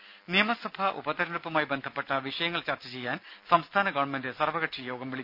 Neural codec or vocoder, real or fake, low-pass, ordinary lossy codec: none; real; 5.4 kHz; none